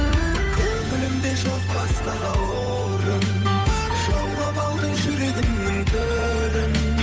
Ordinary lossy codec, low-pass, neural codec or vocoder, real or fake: none; none; codec, 16 kHz, 8 kbps, FunCodec, trained on Chinese and English, 25 frames a second; fake